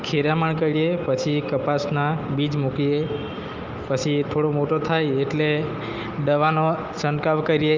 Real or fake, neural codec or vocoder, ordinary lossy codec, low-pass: real; none; Opus, 24 kbps; 7.2 kHz